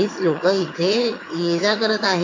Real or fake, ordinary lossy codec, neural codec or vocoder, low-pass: fake; AAC, 32 kbps; vocoder, 22.05 kHz, 80 mel bands, HiFi-GAN; 7.2 kHz